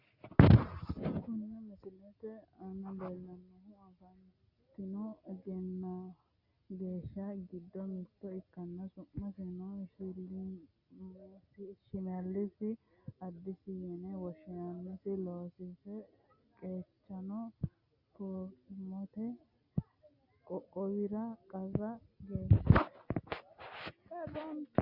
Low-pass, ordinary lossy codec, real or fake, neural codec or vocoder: 5.4 kHz; Opus, 64 kbps; real; none